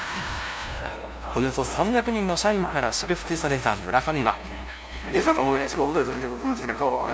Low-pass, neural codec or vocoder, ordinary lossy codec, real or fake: none; codec, 16 kHz, 0.5 kbps, FunCodec, trained on LibriTTS, 25 frames a second; none; fake